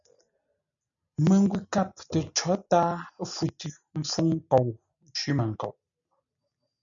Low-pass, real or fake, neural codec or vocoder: 7.2 kHz; real; none